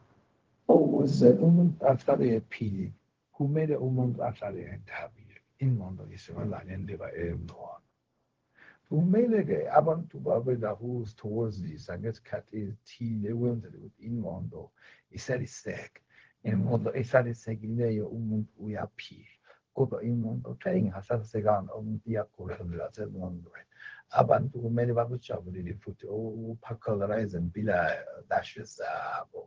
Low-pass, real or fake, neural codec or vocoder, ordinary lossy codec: 7.2 kHz; fake; codec, 16 kHz, 0.4 kbps, LongCat-Audio-Codec; Opus, 16 kbps